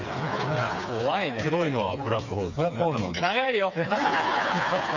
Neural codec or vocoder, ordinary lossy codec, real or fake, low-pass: codec, 16 kHz, 4 kbps, FreqCodec, smaller model; none; fake; 7.2 kHz